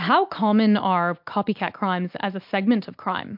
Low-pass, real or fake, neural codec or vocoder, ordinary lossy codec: 5.4 kHz; real; none; MP3, 48 kbps